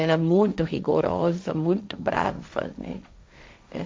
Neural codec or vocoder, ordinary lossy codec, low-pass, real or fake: codec, 16 kHz, 1.1 kbps, Voila-Tokenizer; none; none; fake